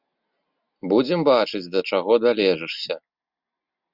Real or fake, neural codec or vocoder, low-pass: real; none; 5.4 kHz